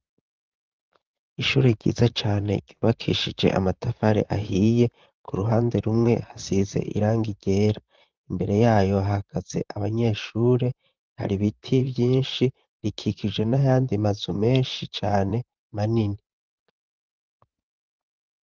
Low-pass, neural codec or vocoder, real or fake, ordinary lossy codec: 7.2 kHz; vocoder, 44.1 kHz, 128 mel bands, Pupu-Vocoder; fake; Opus, 32 kbps